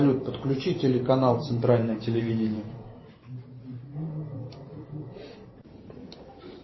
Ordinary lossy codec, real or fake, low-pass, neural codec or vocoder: MP3, 24 kbps; fake; 7.2 kHz; vocoder, 44.1 kHz, 128 mel bands every 512 samples, BigVGAN v2